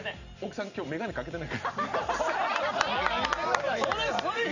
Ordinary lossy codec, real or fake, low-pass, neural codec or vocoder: none; real; 7.2 kHz; none